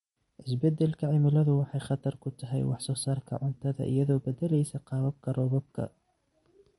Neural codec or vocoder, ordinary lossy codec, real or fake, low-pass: none; MP3, 48 kbps; real; 19.8 kHz